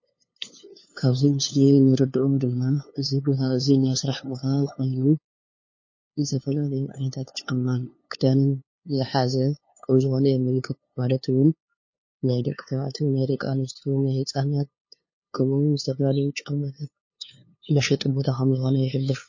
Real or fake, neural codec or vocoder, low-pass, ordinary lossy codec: fake; codec, 16 kHz, 2 kbps, FunCodec, trained on LibriTTS, 25 frames a second; 7.2 kHz; MP3, 32 kbps